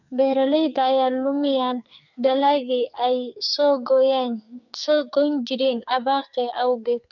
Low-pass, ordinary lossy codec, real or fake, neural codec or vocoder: 7.2 kHz; none; fake; codec, 44.1 kHz, 2.6 kbps, SNAC